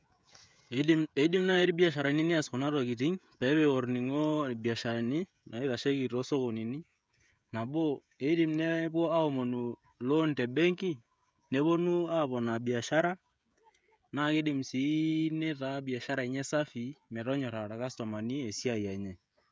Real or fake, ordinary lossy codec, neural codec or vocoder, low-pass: fake; none; codec, 16 kHz, 16 kbps, FreqCodec, smaller model; none